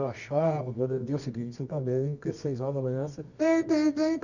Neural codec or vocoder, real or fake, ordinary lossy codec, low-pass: codec, 24 kHz, 0.9 kbps, WavTokenizer, medium music audio release; fake; none; 7.2 kHz